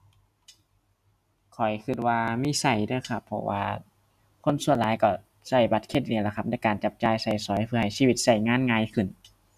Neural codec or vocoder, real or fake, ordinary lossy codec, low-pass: none; real; none; 14.4 kHz